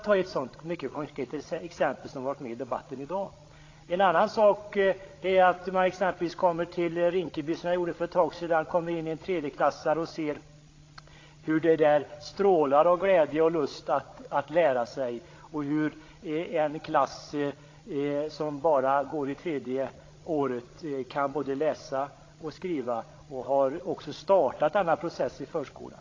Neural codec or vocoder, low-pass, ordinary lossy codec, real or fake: codec, 16 kHz, 16 kbps, FreqCodec, larger model; 7.2 kHz; AAC, 32 kbps; fake